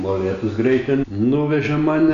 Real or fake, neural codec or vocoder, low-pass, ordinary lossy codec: real; none; 7.2 kHz; AAC, 96 kbps